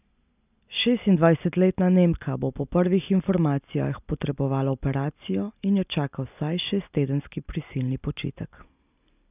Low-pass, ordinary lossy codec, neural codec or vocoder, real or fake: 3.6 kHz; none; none; real